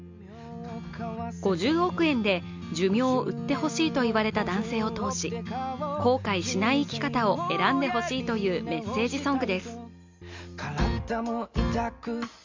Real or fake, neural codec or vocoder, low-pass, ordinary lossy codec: real; none; 7.2 kHz; MP3, 64 kbps